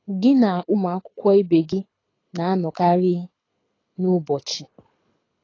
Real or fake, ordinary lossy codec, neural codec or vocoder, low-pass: fake; AAC, 32 kbps; codec, 24 kHz, 6 kbps, HILCodec; 7.2 kHz